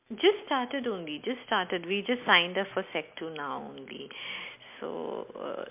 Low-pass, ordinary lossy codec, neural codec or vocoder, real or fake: 3.6 kHz; MP3, 32 kbps; none; real